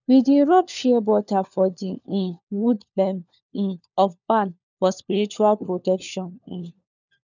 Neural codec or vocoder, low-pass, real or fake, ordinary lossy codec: codec, 16 kHz, 4 kbps, FunCodec, trained on LibriTTS, 50 frames a second; 7.2 kHz; fake; none